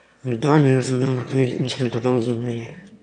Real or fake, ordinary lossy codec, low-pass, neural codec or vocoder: fake; none; 9.9 kHz; autoencoder, 22.05 kHz, a latent of 192 numbers a frame, VITS, trained on one speaker